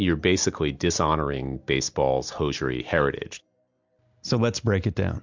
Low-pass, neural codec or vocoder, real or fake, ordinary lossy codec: 7.2 kHz; none; real; MP3, 64 kbps